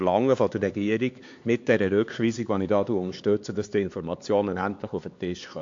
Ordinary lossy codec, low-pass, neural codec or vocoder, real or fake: AAC, 48 kbps; 7.2 kHz; codec, 16 kHz, 4 kbps, X-Codec, HuBERT features, trained on LibriSpeech; fake